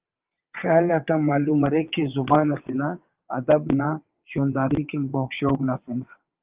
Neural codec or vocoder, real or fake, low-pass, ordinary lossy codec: vocoder, 22.05 kHz, 80 mel bands, WaveNeXt; fake; 3.6 kHz; Opus, 32 kbps